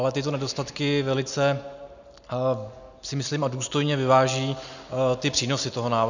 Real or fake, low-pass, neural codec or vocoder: real; 7.2 kHz; none